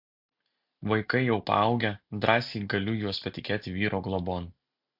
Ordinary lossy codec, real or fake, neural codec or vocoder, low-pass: MP3, 48 kbps; real; none; 5.4 kHz